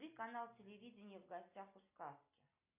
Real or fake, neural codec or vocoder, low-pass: real; none; 3.6 kHz